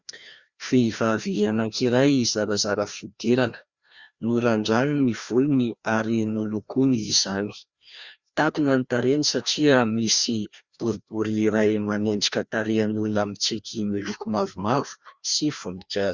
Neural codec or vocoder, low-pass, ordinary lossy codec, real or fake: codec, 16 kHz, 1 kbps, FreqCodec, larger model; 7.2 kHz; Opus, 64 kbps; fake